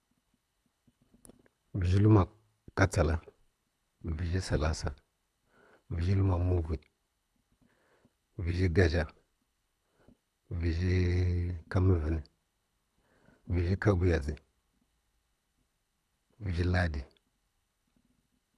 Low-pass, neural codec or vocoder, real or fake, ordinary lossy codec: none; codec, 24 kHz, 6 kbps, HILCodec; fake; none